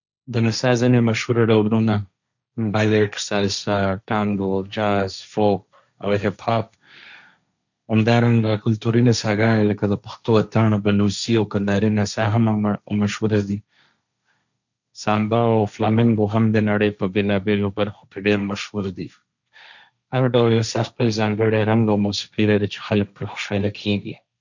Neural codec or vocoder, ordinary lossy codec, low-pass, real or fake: codec, 16 kHz, 1.1 kbps, Voila-Tokenizer; none; 7.2 kHz; fake